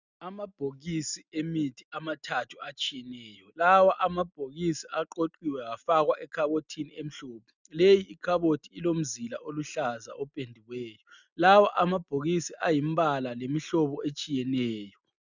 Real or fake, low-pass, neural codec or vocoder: real; 7.2 kHz; none